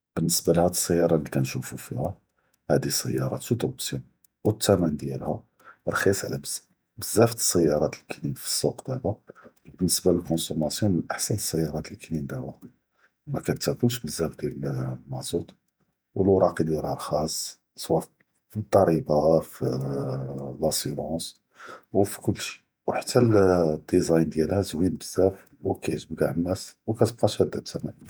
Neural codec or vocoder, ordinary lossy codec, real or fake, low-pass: vocoder, 48 kHz, 128 mel bands, Vocos; none; fake; none